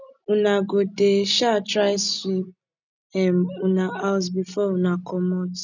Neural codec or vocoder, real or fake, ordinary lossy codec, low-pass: none; real; none; 7.2 kHz